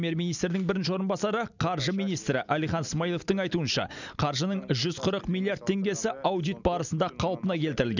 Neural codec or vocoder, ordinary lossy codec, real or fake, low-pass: none; none; real; 7.2 kHz